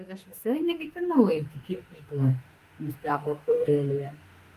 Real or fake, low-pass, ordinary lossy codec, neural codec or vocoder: fake; 14.4 kHz; Opus, 32 kbps; autoencoder, 48 kHz, 32 numbers a frame, DAC-VAE, trained on Japanese speech